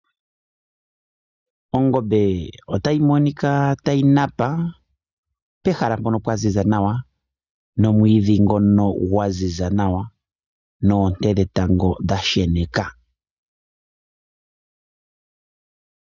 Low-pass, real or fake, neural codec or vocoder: 7.2 kHz; real; none